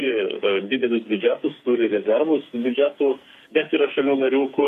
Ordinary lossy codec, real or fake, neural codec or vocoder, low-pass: MP3, 64 kbps; fake; codec, 44.1 kHz, 2.6 kbps, SNAC; 14.4 kHz